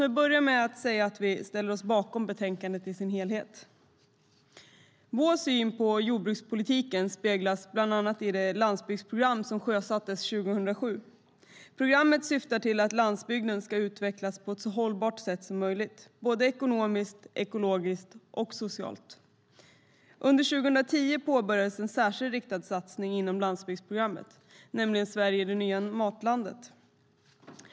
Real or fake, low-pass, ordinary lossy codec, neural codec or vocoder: real; none; none; none